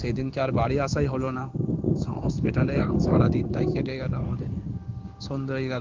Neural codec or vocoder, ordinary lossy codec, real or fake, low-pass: codec, 16 kHz in and 24 kHz out, 1 kbps, XY-Tokenizer; Opus, 24 kbps; fake; 7.2 kHz